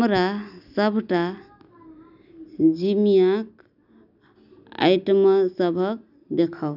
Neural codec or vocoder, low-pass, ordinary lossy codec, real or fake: none; 5.4 kHz; none; real